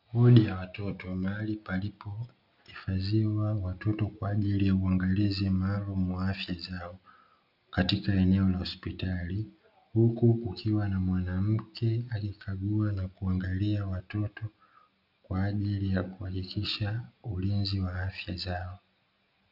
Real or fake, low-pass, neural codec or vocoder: real; 5.4 kHz; none